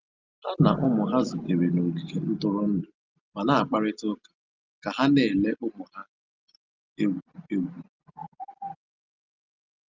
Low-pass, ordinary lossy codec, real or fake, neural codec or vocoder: 7.2 kHz; Opus, 24 kbps; real; none